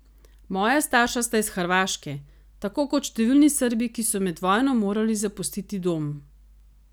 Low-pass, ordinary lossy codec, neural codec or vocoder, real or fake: none; none; none; real